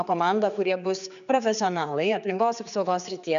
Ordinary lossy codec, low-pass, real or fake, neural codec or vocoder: MP3, 96 kbps; 7.2 kHz; fake; codec, 16 kHz, 4 kbps, X-Codec, HuBERT features, trained on general audio